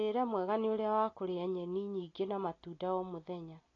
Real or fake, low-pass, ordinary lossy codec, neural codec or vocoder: real; 7.2 kHz; AAC, 32 kbps; none